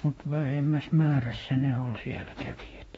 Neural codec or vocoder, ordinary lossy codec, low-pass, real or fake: autoencoder, 48 kHz, 32 numbers a frame, DAC-VAE, trained on Japanese speech; AAC, 24 kbps; 19.8 kHz; fake